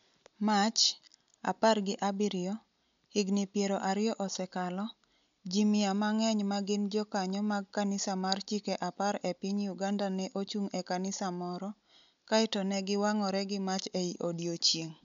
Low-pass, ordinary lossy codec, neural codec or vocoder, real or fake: 7.2 kHz; MP3, 64 kbps; none; real